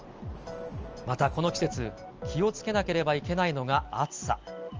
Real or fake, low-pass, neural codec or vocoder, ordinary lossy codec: real; 7.2 kHz; none; Opus, 24 kbps